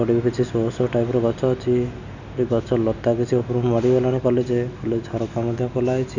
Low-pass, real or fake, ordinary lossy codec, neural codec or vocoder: 7.2 kHz; real; none; none